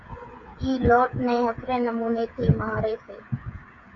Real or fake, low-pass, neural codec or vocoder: fake; 7.2 kHz; codec, 16 kHz, 8 kbps, FreqCodec, smaller model